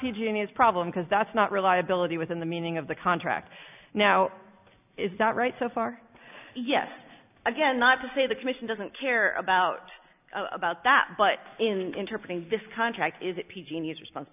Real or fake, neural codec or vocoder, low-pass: real; none; 3.6 kHz